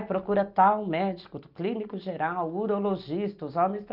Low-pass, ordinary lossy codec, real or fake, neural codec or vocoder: 5.4 kHz; Opus, 24 kbps; real; none